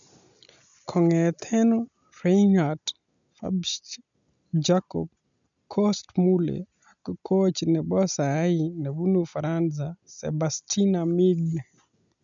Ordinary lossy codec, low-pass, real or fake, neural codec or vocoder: none; 7.2 kHz; real; none